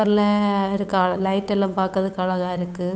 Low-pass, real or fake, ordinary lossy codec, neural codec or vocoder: none; fake; none; codec, 16 kHz, 8 kbps, FunCodec, trained on Chinese and English, 25 frames a second